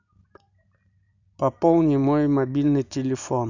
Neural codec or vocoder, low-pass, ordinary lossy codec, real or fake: none; 7.2 kHz; none; real